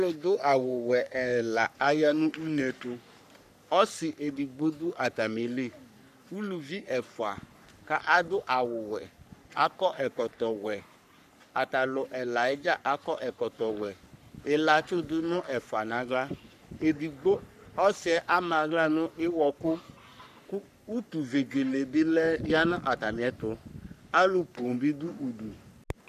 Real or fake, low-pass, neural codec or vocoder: fake; 14.4 kHz; codec, 44.1 kHz, 3.4 kbps, Pupu-Codec